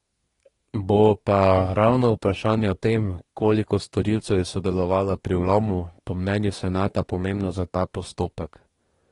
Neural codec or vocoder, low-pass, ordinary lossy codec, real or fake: codec, 24 kHz, 1 kbps, SNAC; 10.8 kHz; AAC, 32 kbps; fake